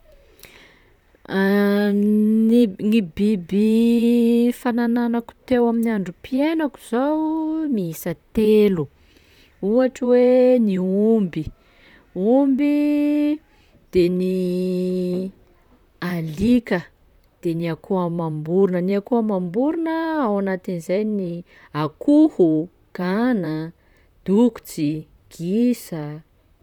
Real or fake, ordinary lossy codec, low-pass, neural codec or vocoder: fake; none; 19.8 kHz; vocoder, 44.1 kHz, 128 mel bands, Pupu-Vocoder